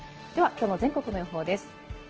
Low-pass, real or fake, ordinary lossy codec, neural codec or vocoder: 7.2 kHz; real; Opus, 16 kbps; none